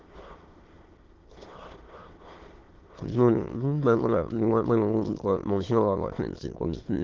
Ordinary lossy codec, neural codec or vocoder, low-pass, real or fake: Opus, 16 kbps; autoencoder, 22.05 kHz, a latent of 192 numbers a frame, VITS, trained on many speakers; 7.2 kHz; fake